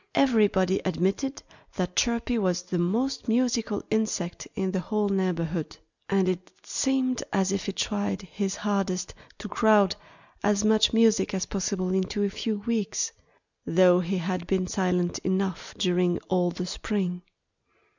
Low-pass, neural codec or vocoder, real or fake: 7.2 kHz; none; real